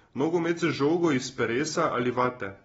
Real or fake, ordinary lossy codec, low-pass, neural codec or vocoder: real; AAC, 24 kbps; 19.8 kHz; none